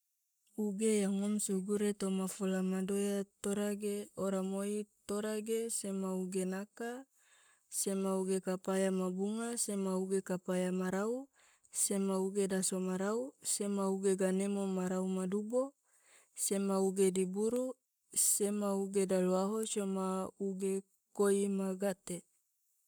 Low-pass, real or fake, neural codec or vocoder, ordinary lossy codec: none; fake; codec, 44.1 kHz, 7.8 kbps, Pupu-Codec; none